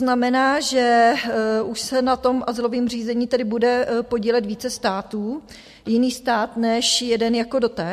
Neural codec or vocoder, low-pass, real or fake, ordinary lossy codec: none; 14.4 kHz; real; MP3, 64 kbps